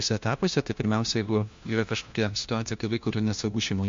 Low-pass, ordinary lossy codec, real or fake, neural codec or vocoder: 7.2 kHz; MP3, 48 kbps; fake; codec, 16 kHz, 1 kbps, FunCodec, trained on LibriTTS, 50 frames a second